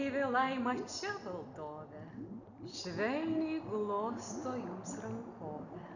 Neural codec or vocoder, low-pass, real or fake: none; 7.2 kHz; real